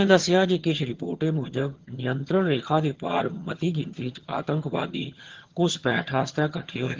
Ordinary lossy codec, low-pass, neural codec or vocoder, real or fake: Opus, 16 kbps; 7.2 kHz; vocoder, 22.05 kHz, 80 mel bands, HiFi-GAN; fake